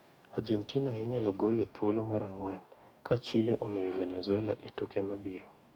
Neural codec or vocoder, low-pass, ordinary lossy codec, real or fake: codec, 44.1 kHz, 2.6 kbps, DAC; 19.8 kHz; none; fake